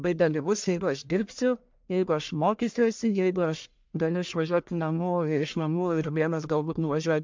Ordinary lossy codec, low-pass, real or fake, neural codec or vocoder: MP3, 64 kbps; 7.2 kHz; fake; codec, 44.1 kHz, 1.7 kbps, Pupu-Codec